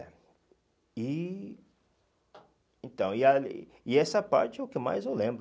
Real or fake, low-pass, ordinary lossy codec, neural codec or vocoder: real; none; none; none